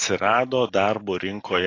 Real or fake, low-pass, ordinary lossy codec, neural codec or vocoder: real; 7.2 kHz; AAC, 32 kbps; none